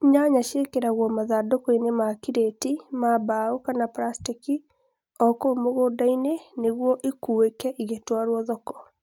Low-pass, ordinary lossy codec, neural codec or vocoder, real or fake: 19.8 kHz; none; none; real